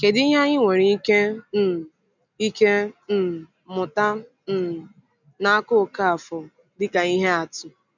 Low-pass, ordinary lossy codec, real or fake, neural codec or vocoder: 7.2 kHz; none; real; none